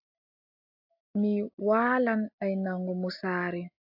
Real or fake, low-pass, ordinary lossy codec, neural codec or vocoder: real; 5.4 kHz; AAC, 48 kbps; none